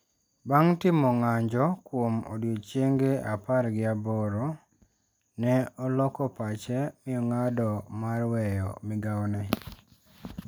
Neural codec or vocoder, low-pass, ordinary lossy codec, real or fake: none; none; none; real